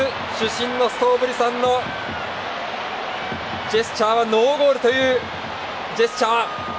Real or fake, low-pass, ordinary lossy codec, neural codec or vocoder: real; none; none; none